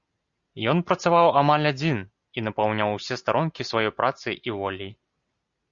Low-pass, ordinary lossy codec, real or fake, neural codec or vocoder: 7.2 kHz; Opus, 64 kbps; real; none